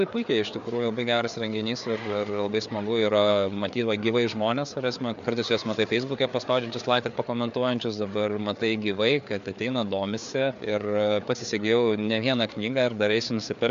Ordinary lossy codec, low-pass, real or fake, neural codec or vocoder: MP3, 64 kbps; 7.2 kHz; fake; codec, 16 kHz, 4 kbps, FreqCodec, larger model